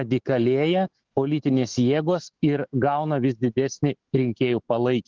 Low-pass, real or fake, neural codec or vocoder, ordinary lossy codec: 7.2 kHz; fake; autoencoder, 48 kHz, 128 numbers a frame, DAC-VAE, trained on Japanese speech; Opus, 16 kbps